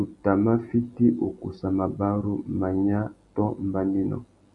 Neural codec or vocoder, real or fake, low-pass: none; real; 10.8 kHz